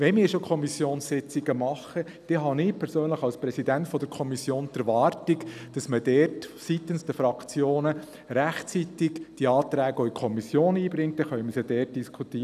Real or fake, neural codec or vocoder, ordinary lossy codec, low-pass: real; none; none; 14.4 kHz